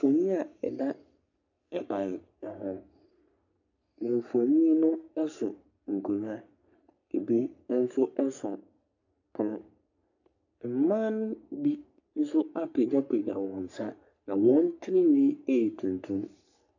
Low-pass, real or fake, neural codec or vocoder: 7.2 kHz; fake; codec, 44.1 kHz, 3.4 kbps, Pupu-Codec